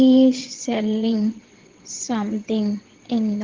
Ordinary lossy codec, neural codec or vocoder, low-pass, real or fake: Opus, 16 kbps; vocoder, 22.05 kHz, 80 mel bands, WaveNeXt; 7.2 kHz; fake